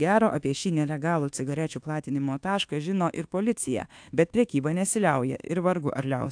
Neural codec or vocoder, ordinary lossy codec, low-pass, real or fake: codec, 24 kHz, 1.2 kbps, DualCodec; AAC, 64 kbps; 9.9 kHz; fake